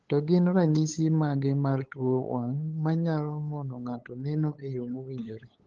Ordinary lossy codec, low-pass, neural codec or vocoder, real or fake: Opus, 16 kbps; 7.2 kHz; codec, 16 kHz, 8 kbps, FunCodec, trained on LibriTTS, 25 frames a second; fake